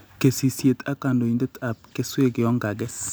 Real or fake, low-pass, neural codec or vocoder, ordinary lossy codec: real; none; none; none